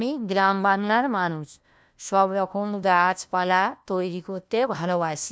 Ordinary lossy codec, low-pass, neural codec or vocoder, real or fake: none; none; codec, 16 kHz, 1 kbps, FunCodec, trained on LibriTTS, 50 frames a second; fake